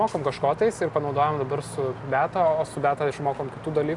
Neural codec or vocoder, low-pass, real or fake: none; 10.8 kHz; real